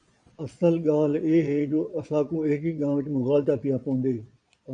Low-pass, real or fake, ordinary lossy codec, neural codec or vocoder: 9.9 kHz; fake; AAC, 64 kbps; vocoder, 22.05 kHz, 80 mel bands, Vocos